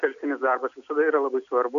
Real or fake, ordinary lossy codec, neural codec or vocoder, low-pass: real; AAC, 96 kbps; none; 7.2 kHz